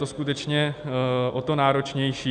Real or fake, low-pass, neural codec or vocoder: real; 10.8 kHz; none